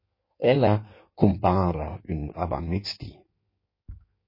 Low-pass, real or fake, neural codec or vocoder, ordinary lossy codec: 5.4 kHz; fake; codec, 16 kHz in and 24 kHz out, 1.1 kbps, FireRedTTS-2 codec; MP3, 24 kbps